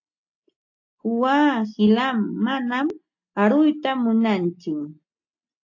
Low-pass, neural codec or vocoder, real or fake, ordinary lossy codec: 7.2 kHz; none; real; AAC, 48 kbps